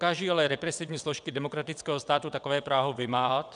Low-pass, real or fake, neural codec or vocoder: 9.9 kHz; fake; vocoder, 22.05 kHz, 80 mel bands, WaveNeXt